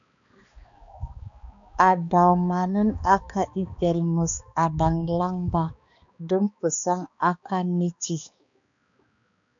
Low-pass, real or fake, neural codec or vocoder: 7.2 kHz; fake; codec, 16 kHz, 2 kbps, X-Codec, HuBERT features, trained on balanced general audio